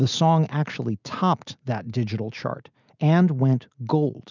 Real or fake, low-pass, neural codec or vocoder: real; 7.2 kHz; none